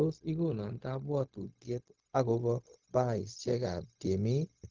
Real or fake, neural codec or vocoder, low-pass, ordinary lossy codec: fake; codec, 16 kHz, 0.4 kbps, LongCat-Audio-Codec; 7.2 kHz; Opus, 16 kbps